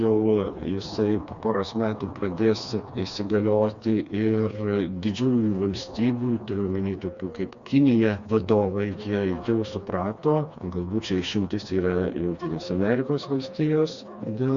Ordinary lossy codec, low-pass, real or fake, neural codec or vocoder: Opus, 64 kbps; 7.2 kHz; fake; codec, 16 kHz, 2 kbps, FreqCodec, smaller model